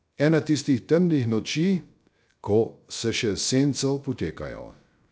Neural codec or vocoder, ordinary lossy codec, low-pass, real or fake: codec, 16 kHz, 0.3 kbps, FocalCodec; none; none; fake